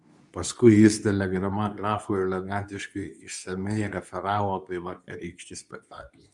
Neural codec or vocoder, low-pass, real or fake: codec, 24 kHz, 0.9 kbps, WavTokenizer, medium speech release version 2; 10.8 kHz; fake